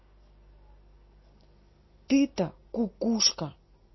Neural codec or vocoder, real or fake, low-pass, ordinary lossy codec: none; real; 7.2 kHz; MP3, 24 kbps